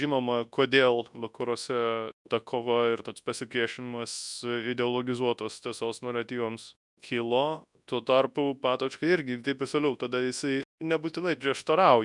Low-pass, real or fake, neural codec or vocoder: 10.8 kHz; fake; codec, 24 kHz, 0.9 kbps, WavTokenizer, large speech release